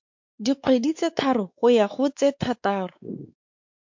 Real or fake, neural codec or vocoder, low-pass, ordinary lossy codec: fake; codec, 16 kHz, 4 kbps, X-Codec, WavLM features, trained on Multilingual LibriSpeech; 7.2 kHz; MP3, 48 kbps